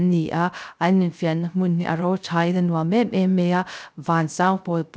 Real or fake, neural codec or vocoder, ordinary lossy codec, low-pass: fake; codec, 16 kHz, 0.3 kbps, FocalCodec; none; none